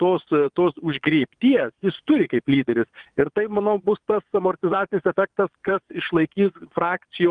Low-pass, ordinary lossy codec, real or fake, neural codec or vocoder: 9.9 kHz; Opus, 32 kbps; fake; vocoder, 22.05 kHz, 80 mel bands, Vocos